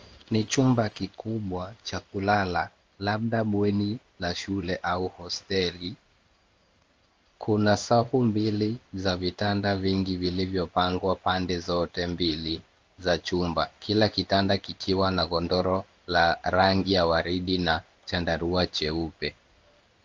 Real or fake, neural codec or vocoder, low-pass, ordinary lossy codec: fake; codec, 16 kHz in and 24 kHz out, 1 kbps, XY-Tokenizer; 7.2 kHz; Opus, 24 kbps